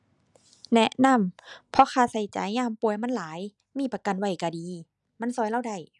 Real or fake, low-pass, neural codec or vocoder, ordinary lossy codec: real; 10.8 kHz; none; none